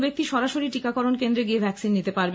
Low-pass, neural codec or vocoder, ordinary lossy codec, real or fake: none; none; none; real